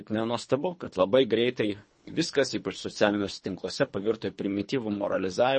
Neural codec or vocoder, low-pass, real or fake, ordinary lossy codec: codec, 24 kHz, 3 kbps, HILCodec; 10.8 kHz; fake; MP3, 32 kbps